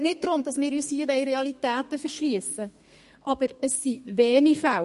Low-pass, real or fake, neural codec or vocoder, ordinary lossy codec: 14.4 kHz; fake; codec, 32 kHz, 1.9 kbps, SNAC; MP3, 48 kbps